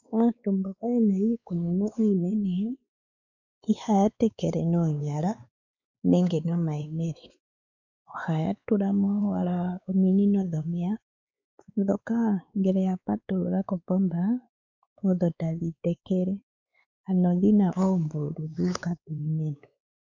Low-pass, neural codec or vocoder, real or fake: 7.2 kHz; codec, 16 kHz, 4 kbps, X-Codec, WavLM features, trained on Multilingual LibriSpeech; fake